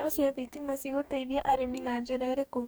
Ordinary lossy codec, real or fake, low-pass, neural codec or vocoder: none; fake; none; codec, 44.1 kHz, 2.6 kbps, DAC